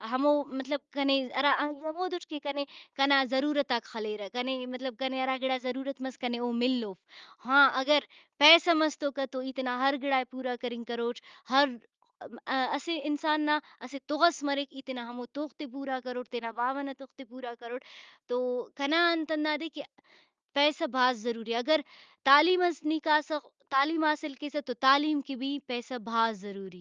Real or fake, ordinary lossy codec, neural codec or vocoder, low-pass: real; Opus, 32 kbps; none; 7.2 kHz